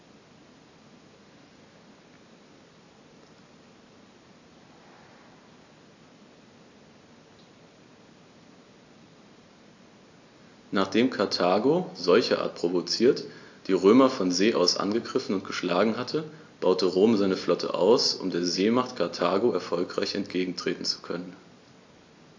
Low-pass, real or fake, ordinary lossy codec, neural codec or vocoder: 7.2 kHz; real; AAC, 48 kbps; none